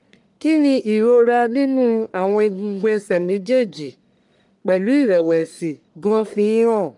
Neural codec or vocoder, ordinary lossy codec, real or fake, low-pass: codec, 44.1 kHz, 1.7 kbps, Pupu-Codec; none; fake; 10.8 kHz